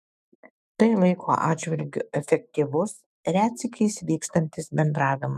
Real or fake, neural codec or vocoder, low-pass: fake; autoencoder, 48 kHz, 128 numbers a frame, DAC-VAE, trained on Japanese speech; 14.4 kHz